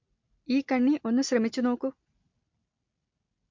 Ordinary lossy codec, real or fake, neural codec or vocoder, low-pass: MP3, 48 kbps; real; none; 7.2 kHz